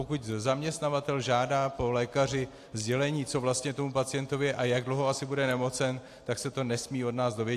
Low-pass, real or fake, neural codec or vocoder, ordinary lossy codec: 14.4 kHz; real; none; AAC, 64 kbps